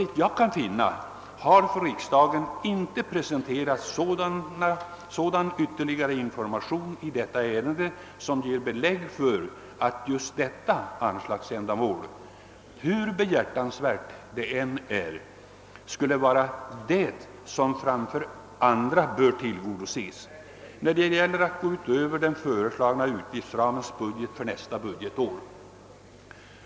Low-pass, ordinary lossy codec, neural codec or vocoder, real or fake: none; none; none; real